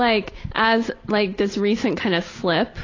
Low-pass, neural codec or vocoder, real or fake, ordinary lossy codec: 7.2 kHz; none; real; AAC, 32 kbps